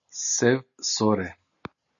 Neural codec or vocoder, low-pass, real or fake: none; 7.2 kHz; real